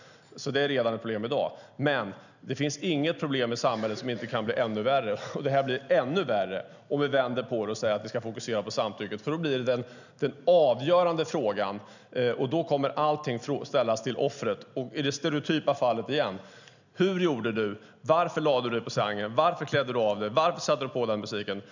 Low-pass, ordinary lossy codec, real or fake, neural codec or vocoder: 7.2 kHz; none; real; none